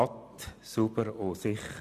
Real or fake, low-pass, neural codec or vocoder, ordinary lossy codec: real; 14.4 kHz; none; none